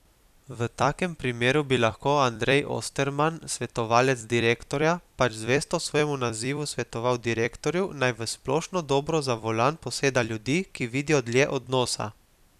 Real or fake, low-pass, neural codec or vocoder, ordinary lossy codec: fake; 14.4 kHz; vocoder, 44.1 kHz, 128 mel bands every 256 samples, BigVGAN v2; none